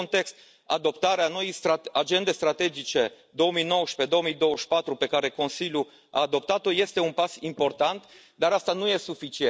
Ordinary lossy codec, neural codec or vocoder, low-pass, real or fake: none; none; none; real